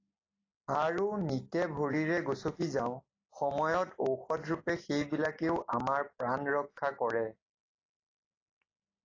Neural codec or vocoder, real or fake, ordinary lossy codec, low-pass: none; real; AAC, 32 kbps; 7.2 kHz